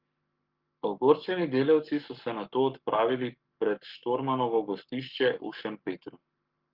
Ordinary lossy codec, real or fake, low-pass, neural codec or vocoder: Opus, 32 kbps; fake; 5.4 kHz; codec, 44.1 kHz, 7.8 kbps, Pupu-Codec